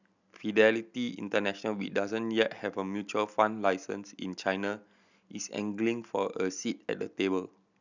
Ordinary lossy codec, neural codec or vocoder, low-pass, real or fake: none; none; 7.2 kHz; real